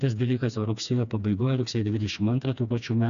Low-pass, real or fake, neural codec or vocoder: 7.2 kHz; fake; codec, 16 kHz, 2 kbps, FreqCodec, smaller model